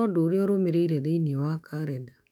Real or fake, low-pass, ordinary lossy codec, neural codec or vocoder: fake; 19.8 kHz; none; autoencoder, 48 kHz, 128 numbers a frame, DAC-VAE, trained on Japanese speech